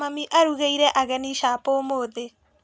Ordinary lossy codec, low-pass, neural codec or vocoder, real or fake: none; none; none; real